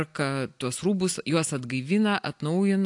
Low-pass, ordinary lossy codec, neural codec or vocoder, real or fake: 10.8 kHz; AAC, 64 kbps; none; real